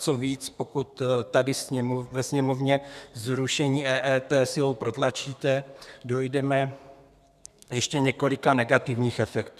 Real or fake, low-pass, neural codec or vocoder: fake; 14.4 kHz; codec, 32 kHz, 1.9 kbps, SNAC